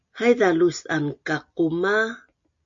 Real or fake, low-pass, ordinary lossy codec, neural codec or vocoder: real; 7.2 kHz; AAC, 64 kbps; none